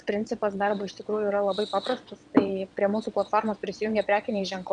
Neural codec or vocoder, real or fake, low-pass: vocoder, 22.05 kHz, 80 mel bands, WaveNeXt; fake; 9.9 kHz